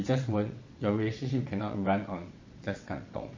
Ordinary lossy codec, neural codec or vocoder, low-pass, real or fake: MP3, 32 kbps; vocoder, 22.05 kHz, 80 mel bands, WaveNeXt; 7.2 kHz; fake